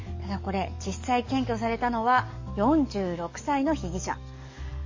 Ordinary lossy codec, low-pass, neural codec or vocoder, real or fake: MP3, 32 kbps; 7.2 kHz; codec, 44.1 kHz, 7.8 kbps, DAC; fake